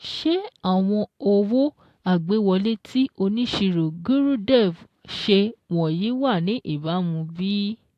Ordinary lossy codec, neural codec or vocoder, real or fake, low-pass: AAC, 64 kbps; none; real; 14.4 kHz